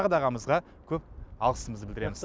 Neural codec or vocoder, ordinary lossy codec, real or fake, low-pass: none; none; real; none